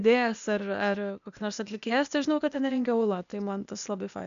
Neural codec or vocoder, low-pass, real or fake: codec, 16 kHz, 0.8 kbps, ZipCodec; 7.2 kHz; fake